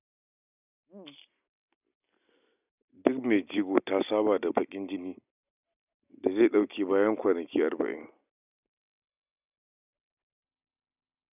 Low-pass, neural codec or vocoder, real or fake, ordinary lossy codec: 3.6 kHz; none; real; none